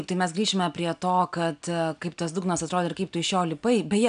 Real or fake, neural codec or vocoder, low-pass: real; none; 9.9 kHz